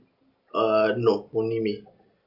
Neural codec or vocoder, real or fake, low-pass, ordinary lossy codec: none; real; 5.4 kHz; none